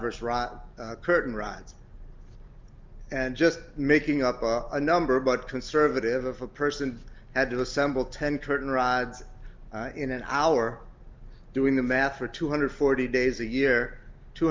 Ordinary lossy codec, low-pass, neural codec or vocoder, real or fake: Opus, 24 kbps; 7.2 kHz; none; real